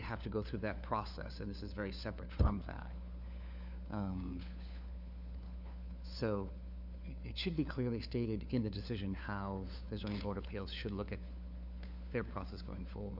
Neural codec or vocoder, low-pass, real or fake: codec, 16 kHz, 4 kbps, FunCodec, trained on LibriTTS, 50 frames a second; 5.4 kHz; fake